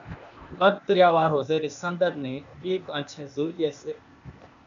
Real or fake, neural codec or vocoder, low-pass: fake; codec, 16 kHz, 0.8 kbps, ZipCodec; 7.2 kHz